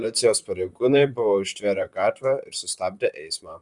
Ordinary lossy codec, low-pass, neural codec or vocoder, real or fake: Opus, 64 kbps; 10.8 kHz; vocoder, 44.1 kHz, 128 mel bands, Pupu-Vocoder; fake